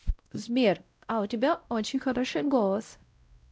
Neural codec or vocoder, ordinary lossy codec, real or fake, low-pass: codec, 16 kHz, 0.5 kbps, X-Codec, WavLM features, trained on Multilingual LibriSpeech; none; fake; none